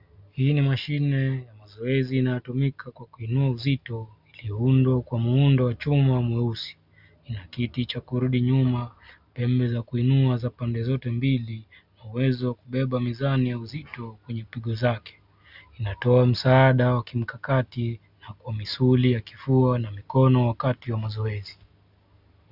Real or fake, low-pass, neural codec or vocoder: real; 5.4 kHz; none